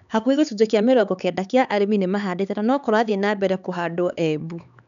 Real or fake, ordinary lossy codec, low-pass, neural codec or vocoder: fake; none; 7.2 kHz; codec, 16 kHz, 4 kbps, X-Codec, HuBERT features, trained on LibriSpeech